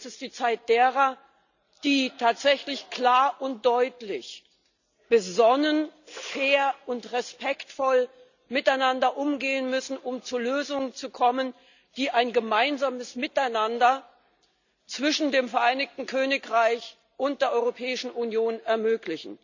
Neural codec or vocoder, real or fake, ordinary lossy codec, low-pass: none; real; none; 7.2 kHz